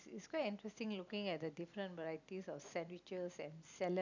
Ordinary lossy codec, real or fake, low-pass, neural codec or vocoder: none; real; 7.2 kHz; none